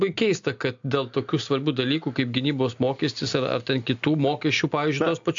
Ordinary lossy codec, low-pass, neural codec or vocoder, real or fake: AAC, 64 kbps; 7.2 kHz; none; real